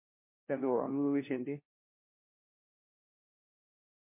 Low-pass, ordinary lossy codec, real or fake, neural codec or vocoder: 3.6 kHz; MP3, 24 kbps; fake; codec, 16 kHz, 1 kbps, FunCodec, trained on LibriTTS, 50 frames a second